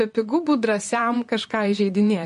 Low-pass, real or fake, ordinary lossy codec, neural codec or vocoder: 14.4 kHz; fake; MP3, 48 kbps; vocoder, 44.1 kHz, 128 mel bands, Pupu-Vocoder